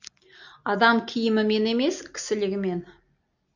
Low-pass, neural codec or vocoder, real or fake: 7.2 kHz; none; real